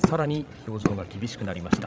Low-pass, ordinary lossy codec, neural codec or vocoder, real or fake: none; none; codec, 16 kHz, 16 kbps, FreqCodec, larger model; fake